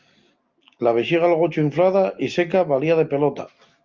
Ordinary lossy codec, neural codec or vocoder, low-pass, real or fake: Opus, 32 kbps; none; 7.2 kHz; real